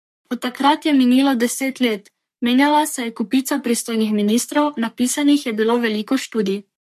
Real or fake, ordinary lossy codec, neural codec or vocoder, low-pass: fake; MP3, 64 kbps; codec, 44.1 kHz, 3.4 kbps, Pupu-Codec; 14.4 kHz